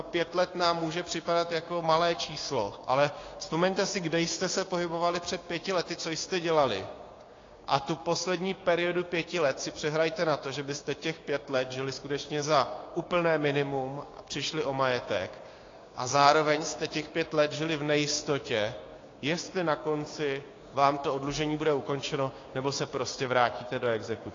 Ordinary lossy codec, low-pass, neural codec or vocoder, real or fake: AAC, 32 kbps; 7.2 kHz; codec, 16 kHz, 6 kbps, DAC; fake